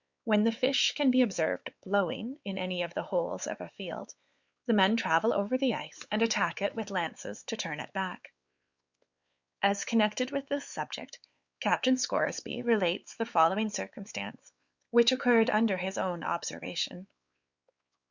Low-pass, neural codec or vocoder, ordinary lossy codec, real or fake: 7.2 kHz; codec, 16 kHz, 4 kbps, X-Codec, WavLM features, trained on Multilingual LibriSpeech; Opus, 64 kbps; fake